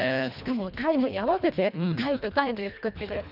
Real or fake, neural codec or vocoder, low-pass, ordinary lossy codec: fake; codec, 24 kHz, 1.5 kbps, HILCodec; 5.4 kHz; none